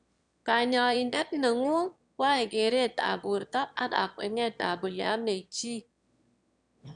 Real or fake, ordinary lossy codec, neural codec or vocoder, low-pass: fake; none; autoencoder, 22.05 kHz, a latent of 192 numbers a frame, VITS, trained on one speaker; 9.9 kHz